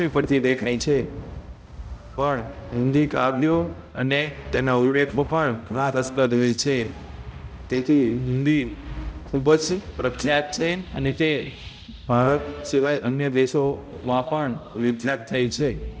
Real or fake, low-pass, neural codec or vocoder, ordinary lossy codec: fake; none; codec, 16 kHz, 0.5 kbps, X-Codec, HuBERT features, trained on balanced general audio; none